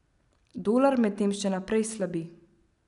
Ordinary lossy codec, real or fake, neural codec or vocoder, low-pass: none; real; none; 10.8 kHz